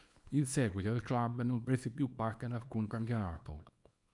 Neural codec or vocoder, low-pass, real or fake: codec, 24 kHz, 0.9 kbps, WavTokenizer, small release; 10.8 kHz; fake